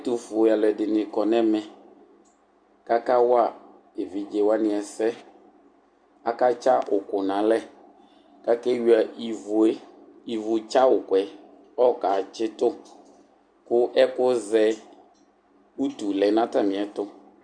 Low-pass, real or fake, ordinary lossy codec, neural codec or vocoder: 9.9 kHz; real; Opus, 64 kbps; none